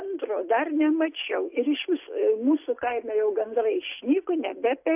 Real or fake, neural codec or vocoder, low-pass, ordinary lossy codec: real; none; 3.6 kHz; AAC, 32 kbps